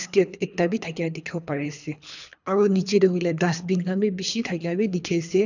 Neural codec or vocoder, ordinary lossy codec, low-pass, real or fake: codec, 24 kHz, 3 kbps, HILCodec; none; 7.2 kHz; fake